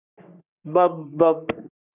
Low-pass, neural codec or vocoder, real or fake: 3.6 kHz; codec, 44.1 kHz, 3.4 kbps, Pupu-Codec; fake